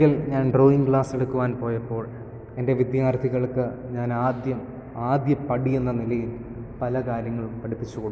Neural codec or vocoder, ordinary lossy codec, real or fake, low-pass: none; none; real; none